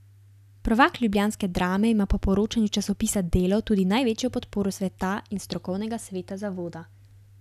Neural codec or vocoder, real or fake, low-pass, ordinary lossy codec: none; real; 14.4 kHz; none